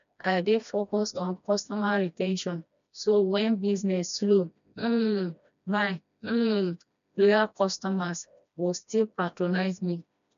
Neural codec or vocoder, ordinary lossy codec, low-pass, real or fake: codec, 16 kHz, 1 kbps, FreqCodec, smaller model; none; 7.2 kHz; fake